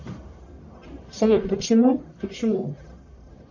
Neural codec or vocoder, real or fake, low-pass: codec, 44.1 kHz, 1.7 kbps, Pupu-Codec; fake; 7.2 kHz